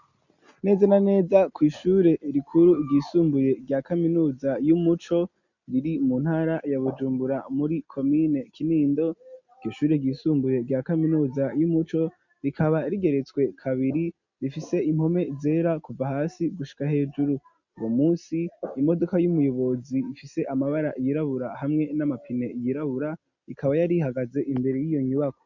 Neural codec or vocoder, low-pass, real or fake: none; 7.2 kHz; real